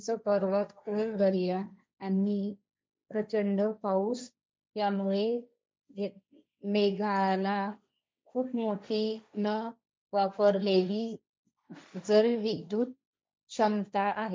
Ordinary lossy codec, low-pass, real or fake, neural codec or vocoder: none; none; fake; codec, 16 kHz, 1.1 kbps, Voila-Tokenizer